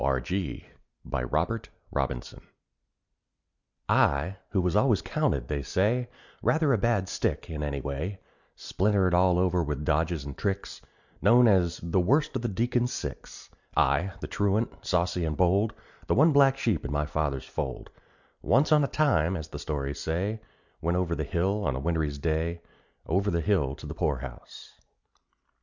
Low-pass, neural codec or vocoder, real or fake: 7.2 kHz; none; real